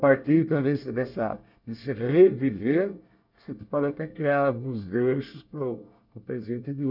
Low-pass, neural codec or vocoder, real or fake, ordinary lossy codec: 5.4 kHz; codec, 24 kHz, 1 kbps, SNAC; fake; none